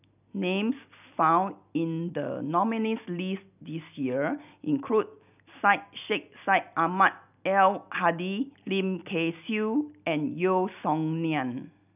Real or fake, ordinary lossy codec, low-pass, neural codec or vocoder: real; none; 3.6 kHz; none